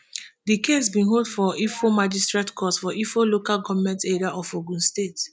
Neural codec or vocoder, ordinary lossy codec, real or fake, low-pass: none; none; real; none